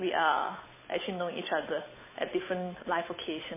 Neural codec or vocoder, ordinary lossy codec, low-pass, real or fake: codec, 16 kHz, 8 kbps, FunCodec, trained on Chinese and English, 25 frames a second; MP3, 16 kbps; 3.6 kHz; fake